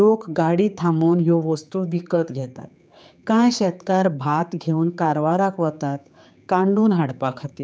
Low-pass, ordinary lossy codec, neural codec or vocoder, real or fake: none; none; codec, 16 kHz, 4 kbps, X-Codec, HuBERT features, trained on general audio; fake